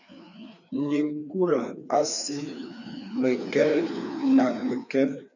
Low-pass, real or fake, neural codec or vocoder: 7.2 kHz; fake; codec, 16 kHz, 2 kbps, FreqCodec, larger model